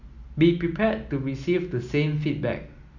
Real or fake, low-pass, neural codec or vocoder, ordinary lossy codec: real; 7.2 kHz; none; none